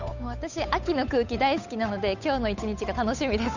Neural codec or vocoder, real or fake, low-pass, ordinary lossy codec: codec, 16 kHz, 8 kbps, FunCodec, trained on Chinese and English, 25 frames a second; fake; 7.2 kHz; none